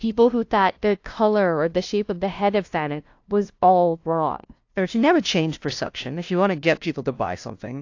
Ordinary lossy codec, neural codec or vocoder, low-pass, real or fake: AAC, 48 kbps; codec, 16 kHz, 0.5 kbps, FunCodec, trained on LibriTTS, 25 frames a second; 7.2 kHz; fake